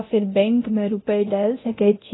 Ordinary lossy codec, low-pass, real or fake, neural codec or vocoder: AAC, 16 kbps; 7.2 kHz; fake; codec, 24 kHz, 0.9 kbps, DualCodec